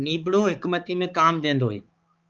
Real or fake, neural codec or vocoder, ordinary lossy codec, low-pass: fake; codec, 16 kHz, 4 kbps, X-Codec, HuBERT features, trained on balanced general audio; Opus, 32 kbps; 7.2 kHz